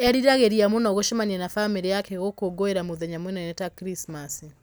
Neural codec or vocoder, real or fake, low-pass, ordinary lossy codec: none; real; none; none